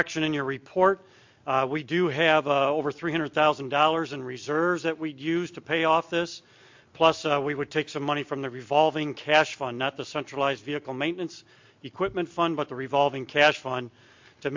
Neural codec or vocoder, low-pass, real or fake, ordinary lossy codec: none; 7.2 kHz; real; MP3, 48 kbps